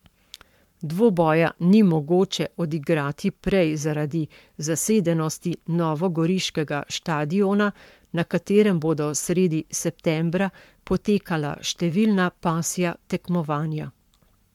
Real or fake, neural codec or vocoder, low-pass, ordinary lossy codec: fake; codec, 44.1 kHz, 7.8 kbps, Pupu-Codec; 19.8 kHz; MP3, 96 kbps